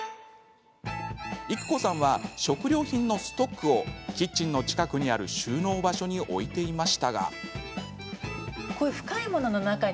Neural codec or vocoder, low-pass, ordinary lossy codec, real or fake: none; none; none; real